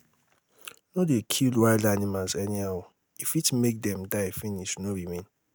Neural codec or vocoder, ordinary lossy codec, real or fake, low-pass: none; none; real; none